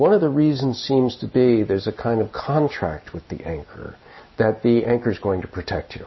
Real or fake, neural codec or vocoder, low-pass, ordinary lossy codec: real; none; 7.2 kHz; MP3, 24 kbps